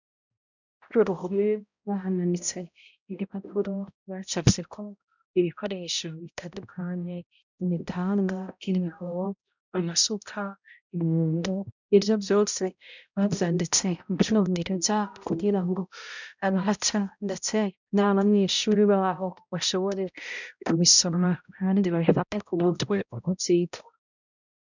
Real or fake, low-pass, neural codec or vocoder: fake; 7.2 kHz; codec, 16 kHz, 0.5 kbps, X-Codec, HuBERT features, trained on balanced general audio